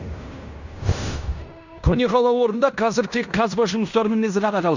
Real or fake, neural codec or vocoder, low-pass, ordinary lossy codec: fake; codec, 16 kHz in and 24 kHz out, 0.9 kbps, LongCat-Audio-Codec, fine tuned four codebook decoder; 7.2 kHz; none